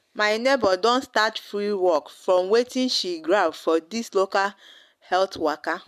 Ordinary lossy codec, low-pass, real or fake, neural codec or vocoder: MP3, 96 kbps; 14.4 kHz; real; none